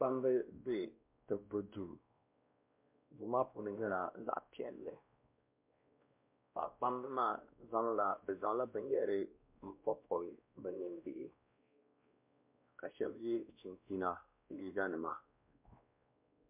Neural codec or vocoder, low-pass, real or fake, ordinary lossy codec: codec, 16 kHz, 1 kbps, X-Codec, WavLM features, trained on Multilingual LibriSpeech; 3.6 kHz; fake; MP3, 32 kbps